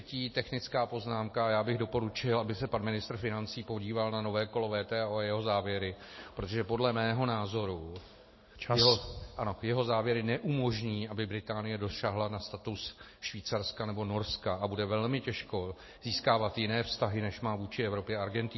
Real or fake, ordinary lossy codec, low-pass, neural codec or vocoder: real; MP3, 24 kbps; 7.2 kHz; none